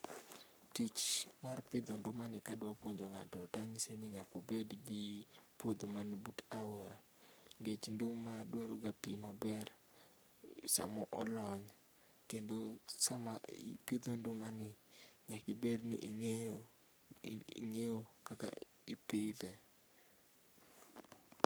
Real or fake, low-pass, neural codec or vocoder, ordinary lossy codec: fake; none; codec, 44.1 kHz, 3.4 kbps, Pupu-Codec; none